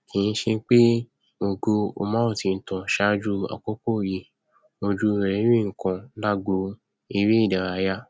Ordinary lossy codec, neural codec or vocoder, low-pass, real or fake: none; none; none; real